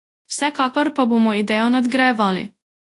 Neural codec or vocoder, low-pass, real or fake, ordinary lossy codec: codec, 24 kHz, 0.9 kbps, WavTokenizer, large speech release; 10.8 kHz; fake; AAC, 48 kbps